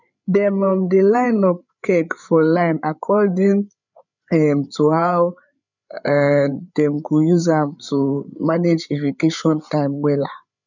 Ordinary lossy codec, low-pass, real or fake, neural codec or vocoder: none; 7.2 kHz; fake; codec, 16 kHz, 8 kbps, FreqCodec, larger model